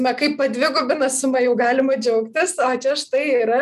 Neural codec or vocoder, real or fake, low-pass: none; real; 14.4 kHz